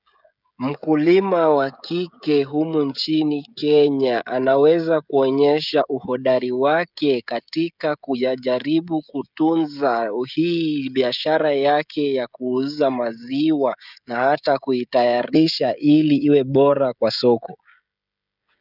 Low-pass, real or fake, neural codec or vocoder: 5.4 kHz; fake; codec, 16 kHz, 16 kbps, FreqCodec, smaller model